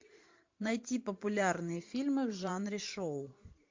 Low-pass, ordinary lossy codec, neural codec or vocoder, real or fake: 7.2 kHz; MP3, 48 kbps; vocoder, 44.1 kHz, 128 mel bands every 512 samples, BigVGAN v2; fake